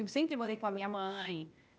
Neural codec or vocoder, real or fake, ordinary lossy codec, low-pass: codec, 16 kHz, 0.8 kbps, ZipCodec; fake; none; none